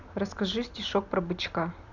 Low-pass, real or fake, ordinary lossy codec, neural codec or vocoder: 7.2 kHz; real; none; none